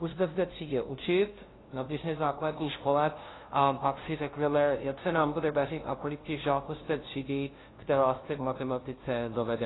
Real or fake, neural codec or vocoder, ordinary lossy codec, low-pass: fake; codec, 16 kHz, 0.5 kbps, FunCodec, trained on LibriTTS, 25 frames a second; AAC, 16 kbps; 7.2 kHz